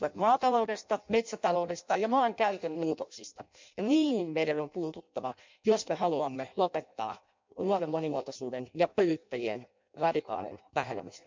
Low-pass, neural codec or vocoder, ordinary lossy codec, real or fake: 7.2 kHz; codec, 16 kHz in and 24 kHz out, 0.6 kbps, FireRedTTS-2 codec; MP3, 64 kbps; fake